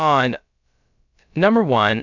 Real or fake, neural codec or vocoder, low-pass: fake; codec, 16 kHz, about 1 kbps, DyCAST, with the encoder's durations; 7.2 kHz